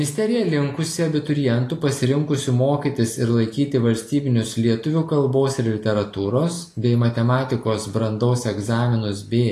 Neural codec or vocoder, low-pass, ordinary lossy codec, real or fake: none; 14.4 kHz; AAC, 48 kbps; real